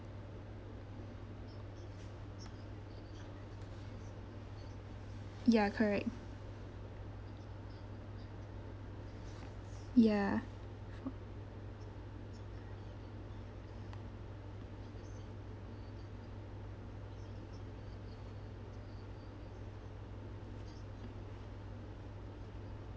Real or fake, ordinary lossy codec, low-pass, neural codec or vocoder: real; none; none; none